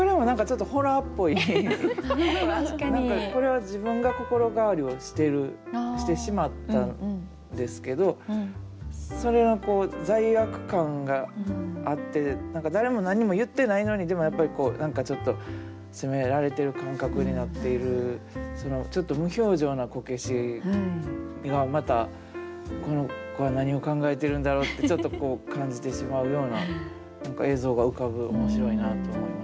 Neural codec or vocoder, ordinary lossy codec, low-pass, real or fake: none; none; none; real